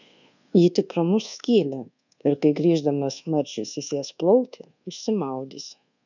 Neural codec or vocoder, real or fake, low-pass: codec, 24 kHz, 1.2 kbps, DualCodec; fake; 7.2 kHz